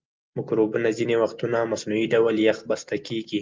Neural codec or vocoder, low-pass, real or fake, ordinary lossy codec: none; 7.2 kHz; real; Opus, 32 kbps